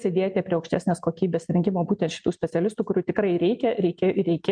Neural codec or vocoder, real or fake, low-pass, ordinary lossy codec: none; real; 9.9 kHz; MP3, 96 kbps